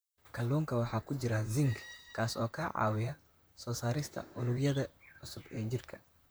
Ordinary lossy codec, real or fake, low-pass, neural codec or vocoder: none; fake; none; vocoder, 44.1 kHz, 128 mel bands, Pupu-Vocoder